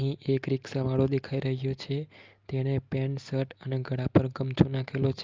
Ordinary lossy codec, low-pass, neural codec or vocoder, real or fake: Opus, 32 kbps; 7.2 kHz; none; real